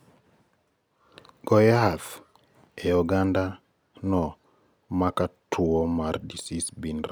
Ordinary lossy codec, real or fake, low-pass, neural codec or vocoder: none; real; none; none